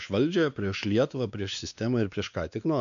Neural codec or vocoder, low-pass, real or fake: codec, 16 kHz, 2 kbps, X-Codec, WavLM features, trained on Multilingual LibriSpeech; 7.2 kHz; fake